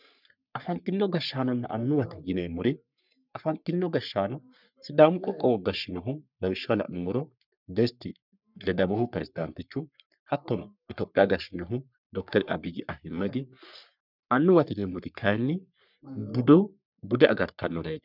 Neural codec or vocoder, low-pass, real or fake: codec, 44.1 kHz, 3.4 kbps, Pupu-Codec; 5.4 kHz; fake